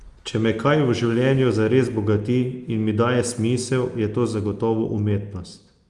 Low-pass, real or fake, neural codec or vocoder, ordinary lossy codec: 10.8 kHz; real; none; Opus, 32 kbps